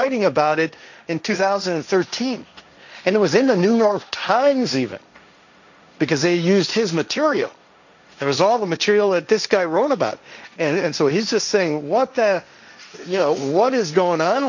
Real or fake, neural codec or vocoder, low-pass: fake; codec, 16 kHz, 1.1 kbps, Voila-Tokenizer; 7.2 kHz